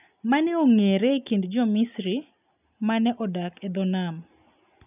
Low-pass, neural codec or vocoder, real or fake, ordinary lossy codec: 3.6 kHz; none; real; none